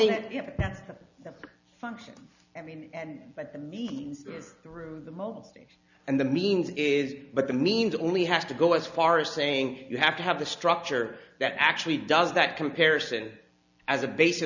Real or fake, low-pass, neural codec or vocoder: real; 7.2 kHz; none